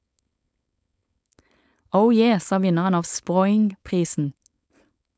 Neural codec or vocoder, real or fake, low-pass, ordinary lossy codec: codec, 16 kHz, 4.8 kbps, FACodec; fake; none; none